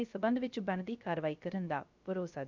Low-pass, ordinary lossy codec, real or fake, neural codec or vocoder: 7.2 kHz; MP3, 64 kbps; fake; codec, 16 kHz, 0.3 kbps, FocalCodec